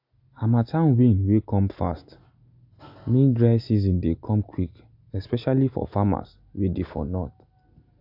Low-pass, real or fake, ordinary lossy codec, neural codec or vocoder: 5.4 kHz; real; AAC, 48 kbps; none